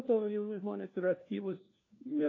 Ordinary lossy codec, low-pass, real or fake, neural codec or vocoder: AAC, 32 kbps; 7.2 kHz; fake; codec, 16 kHz, 1 kbps, FunCodec, trained on LibriTTS, 50 frames a second